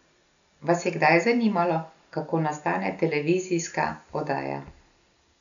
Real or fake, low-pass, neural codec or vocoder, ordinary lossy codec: real; 7.2 kHz; none; none